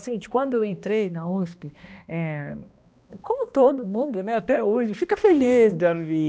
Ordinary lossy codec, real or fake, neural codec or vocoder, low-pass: none; fake; codec, 16 kHz, 1 kbps, X-Codec, HuBERT features, trained on balanced general audio; none